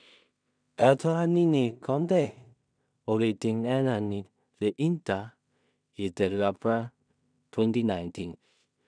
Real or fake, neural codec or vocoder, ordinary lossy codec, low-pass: fake; codec, 16 kHz in and 24 kHz out, 0.4 kbps, LongCat-Audio-Codec, two codebook decoder; none; 9.9 kHz